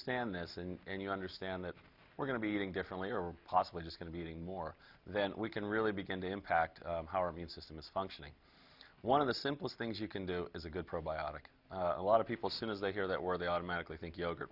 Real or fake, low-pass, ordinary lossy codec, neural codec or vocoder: real; 5.4 kHz; Opus, 64 kbps; none